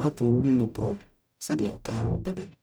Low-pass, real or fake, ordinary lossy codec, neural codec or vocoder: none; fake; none; codec, 44.1 kHz, 0.9 kbps, DAC